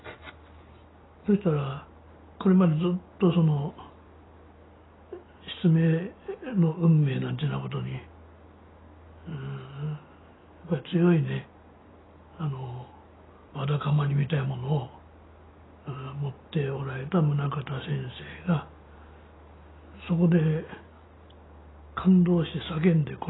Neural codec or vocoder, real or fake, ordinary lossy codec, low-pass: none; real; AAC, 16 kbps; 7.2 kHz